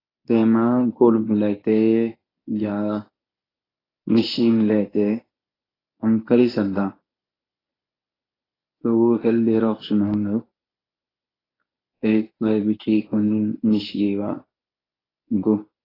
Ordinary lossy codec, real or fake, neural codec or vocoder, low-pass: AAC, 24 kbps; fake; codec, 24 kHz, 0.9 kbps, WavTokenizer, medium speech release version 1; 5.4 kHz